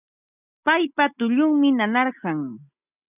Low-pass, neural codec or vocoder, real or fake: 3.6 kHz; none; real